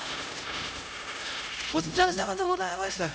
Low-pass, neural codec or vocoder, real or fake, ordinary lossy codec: none; codec, 16 kHz, 0.5 kbps, X-Codec, HuBERT features, trained on LibriSpeech; fake; none